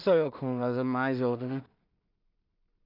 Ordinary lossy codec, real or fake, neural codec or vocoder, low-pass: none; fake; codec, 16 kHz in and 24 kHz out, 0.4 kbps, LongCat-Audio-Codec, two codebook decoder; 5.4 kHz